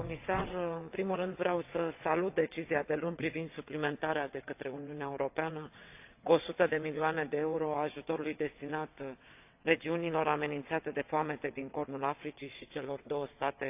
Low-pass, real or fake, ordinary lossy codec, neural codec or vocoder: 3.6 kHz; fake; AAC, 32 kbps; vocoder, 22.05 kHz, 80 mel bands, WaveNeXt